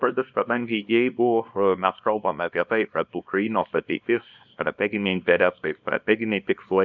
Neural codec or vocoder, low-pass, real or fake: codec, 24 kHz, 0.9 kbps, WavTokenizer, small release; 7.2 kHz; fake